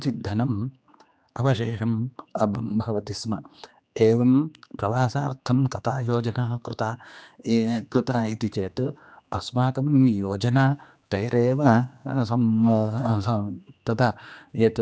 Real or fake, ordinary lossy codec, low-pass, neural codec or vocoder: fake; none; none; codec, 16 kHz, 2 kbps, X-Codec, HuBERT features, trained on general audio